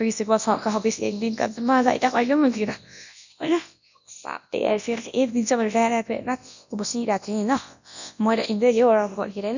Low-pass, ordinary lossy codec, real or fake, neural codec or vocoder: 7.2 kHz; none; fake; codec, 24 kHz, 0.9 kbps, WavTokenizer, large speech release